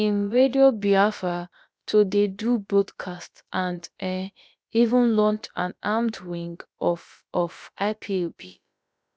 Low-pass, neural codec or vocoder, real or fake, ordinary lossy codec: none; codec, 16 kHz, about 1 kbps, DyCAST, with the encoder's durations; fake; none